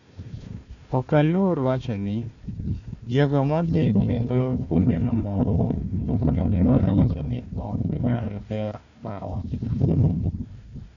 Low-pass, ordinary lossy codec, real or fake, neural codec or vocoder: 7.2 kHz; none; fake; codec, 16 kHz, 1 kbps, FunCodec, trained on Chinese and English, 50 frames a second